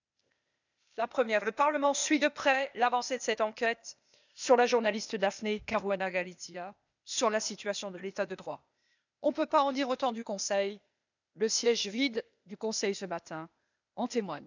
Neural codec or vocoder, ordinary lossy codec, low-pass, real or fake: codec, 16 kHz, 0.8 kbps, ZipCodec; none; 7.2 kHz; fake